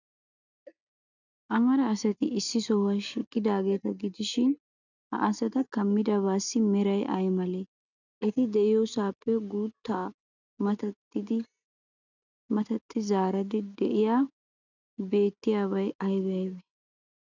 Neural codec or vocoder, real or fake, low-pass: none; real; 7.2 kHz